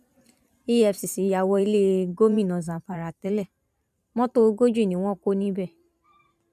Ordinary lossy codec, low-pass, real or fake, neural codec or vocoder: none; 14.4 kHz; fake; vocoder, 44.1 kHz, 128 mel bands every 512 samples, BigVGAN v2